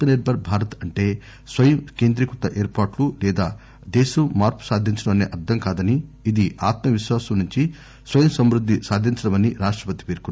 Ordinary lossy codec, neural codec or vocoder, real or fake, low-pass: none; none; real; none